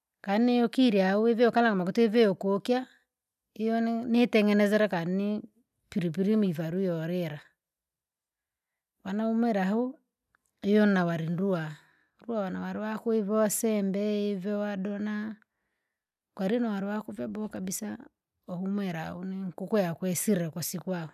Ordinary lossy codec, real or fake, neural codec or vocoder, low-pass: none; real; none; 14.4 kHz